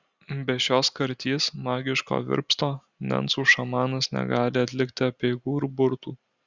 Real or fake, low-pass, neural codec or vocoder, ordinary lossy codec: real; 7.2 kHz; none; Opus, 64 kbps